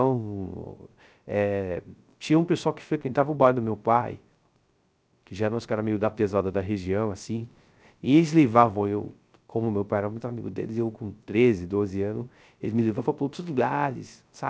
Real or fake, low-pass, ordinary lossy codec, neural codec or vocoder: fake; none; none; codec, 16 kHz, 0.3 kbps, FocalCodec